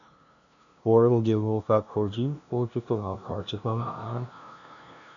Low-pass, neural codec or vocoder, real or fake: 7.2 kHz; codec, 16 kHz, 0.5 kbps, FunCodec, trained on LibriTTS, 25 frames a second; fake